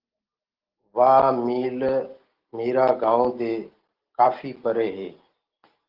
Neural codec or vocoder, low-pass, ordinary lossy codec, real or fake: none; 5.4 kHz; Opus, 16 kbps; real